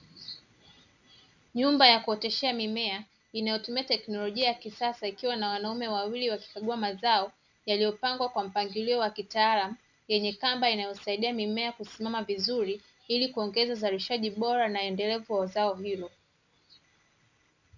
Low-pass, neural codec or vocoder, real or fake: 7.2 kHz; none; real